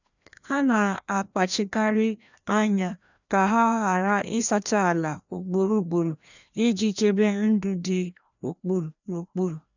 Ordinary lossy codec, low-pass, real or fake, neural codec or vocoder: none; 7.2 kHz; fake; codec, 16 kHz, 1 kbps, FreqCodec, larger model